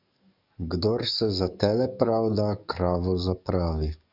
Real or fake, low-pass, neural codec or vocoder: fake; 5.4 kHz; codec, 44.1 kHz, 7.8 kbps, DAC